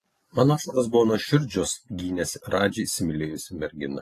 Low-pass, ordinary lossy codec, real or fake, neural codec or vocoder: 14.4 kHz; AAC, 48 kbps; real; none